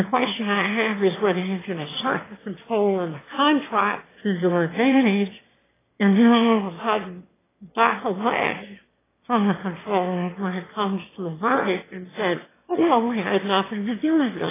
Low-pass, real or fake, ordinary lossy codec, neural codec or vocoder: 3.6 kHz; fake; AAC, 16 kbps; autoencoder, 22.05 kHz, a latent of 192 numbers a frame, VITS, trained on one speaker